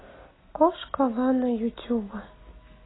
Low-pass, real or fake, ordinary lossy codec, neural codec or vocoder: 7.2 kHz; real; AAC, 16 kbps; none